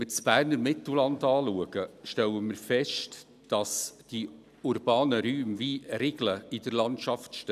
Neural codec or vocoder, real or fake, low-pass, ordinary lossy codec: none; real; 14.4 kHz; none